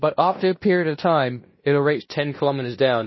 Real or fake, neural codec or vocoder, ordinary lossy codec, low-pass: fake; codec, 16 kHz in and 24 kHz out, 0.9 kbps, LongCat-Audio-Codec, fine tuned four codebook decoder; MP3, 24 kbps; 7.2 kHz